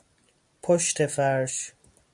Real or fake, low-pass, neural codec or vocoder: real; 10.8 kHz; none